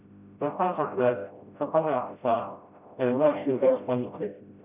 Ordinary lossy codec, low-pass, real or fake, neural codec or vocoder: none; 3.6 kHz; fake; codec, 16 kHz, 0.5 kbps, FreqCodec, smaller model